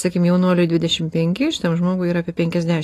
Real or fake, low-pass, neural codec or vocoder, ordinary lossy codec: real; 14.4 kHz; none; AAC, 48 kbps